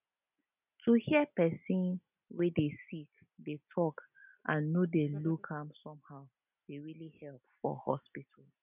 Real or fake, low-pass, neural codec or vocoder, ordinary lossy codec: real; 3.6 kHz; none; none